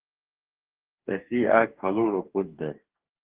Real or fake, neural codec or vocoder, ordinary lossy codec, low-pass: fake; codec, 44.1 kHz, 2.6 kbps, DAC; Opus, 16 kbps; 3.6 kHz